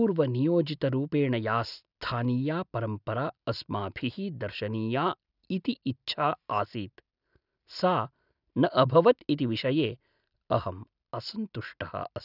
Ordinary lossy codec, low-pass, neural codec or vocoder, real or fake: none; 5.4 kHz; none; real